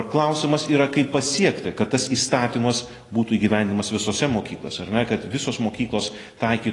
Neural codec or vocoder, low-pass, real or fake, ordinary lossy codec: none; 10.8 kHz; real; AAC, 32 kbps